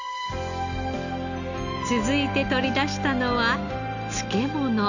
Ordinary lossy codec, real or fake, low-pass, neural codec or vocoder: none; real; 7.2 kHz; none